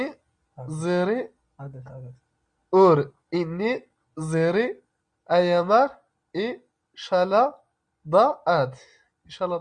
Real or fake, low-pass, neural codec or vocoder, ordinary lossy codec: real; 9.9 kHz; none; Opus, 64 kbps